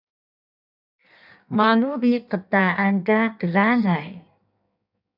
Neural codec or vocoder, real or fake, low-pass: codec, 16 kHz in and 24 kHz out, 1.1 kbps, FireRedTTS-2 codec; fake; 5.4 kHz